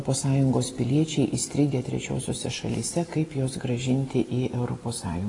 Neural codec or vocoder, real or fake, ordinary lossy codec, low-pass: none; real; AAC, 32 kbps; 10.8 kHz